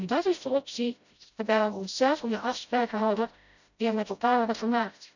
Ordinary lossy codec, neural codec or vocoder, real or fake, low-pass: none; codec, 16 kHz, 0.5 kbps, FreqCodec, smaller model; fake; 7.2 kHz